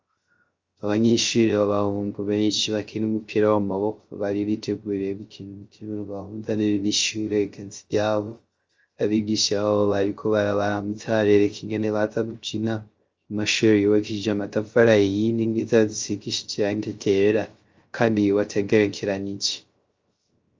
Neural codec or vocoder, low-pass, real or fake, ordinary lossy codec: codec, 16 kHz, 0.3 kbps, FocalCodec; 7.2 kHz; fake; Opus, 32 kbps